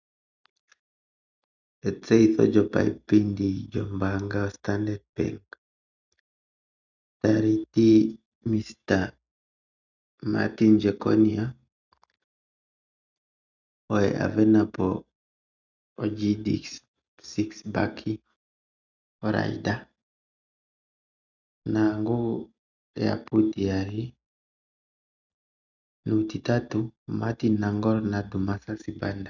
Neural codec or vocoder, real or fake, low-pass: none; real; 7.2 kHz